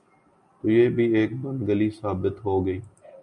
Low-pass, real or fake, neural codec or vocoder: 10.8 kHz; real; none